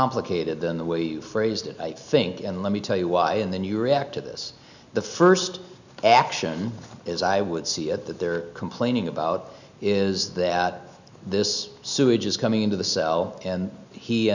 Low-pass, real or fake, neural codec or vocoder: 7.2 kHz; real; none